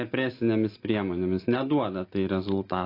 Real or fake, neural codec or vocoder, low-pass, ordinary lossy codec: real; none; 5.4 kHz; AAC, 32 kbps